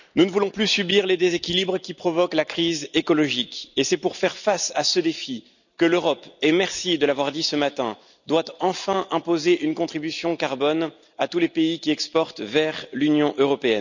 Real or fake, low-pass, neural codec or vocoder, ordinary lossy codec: real; 7.2 kHz; none; none